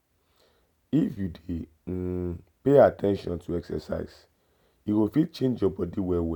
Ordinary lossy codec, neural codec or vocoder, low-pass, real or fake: none; none; 19.8 kHz; real